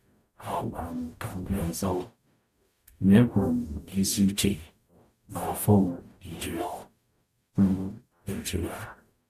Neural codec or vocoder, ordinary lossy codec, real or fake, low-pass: codec, 44.1 kHz, 0.9 kbps, DAC; none; fake; 14.4 kHz